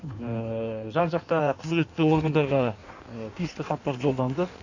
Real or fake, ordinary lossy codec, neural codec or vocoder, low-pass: fake; none; codec, 16 kHz in and 24 kHz out, 1.1 kbps, FireRedTTS-2 codec; 7.2 kHz